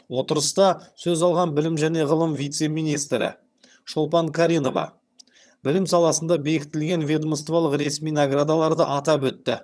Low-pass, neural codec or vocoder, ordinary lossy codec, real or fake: none; vocoder, 22.05 kHz, 80 mel bands, HiFi-GAN; none; fake